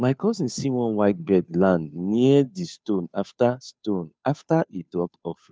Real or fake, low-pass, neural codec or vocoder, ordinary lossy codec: fake; none; codec, 16 kHz, 2 kbps, FunCodec, trained on Chinese and English, 25 frames a second; none